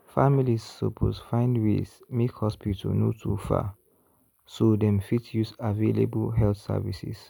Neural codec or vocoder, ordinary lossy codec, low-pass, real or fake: none; none; 19.8 kHz; real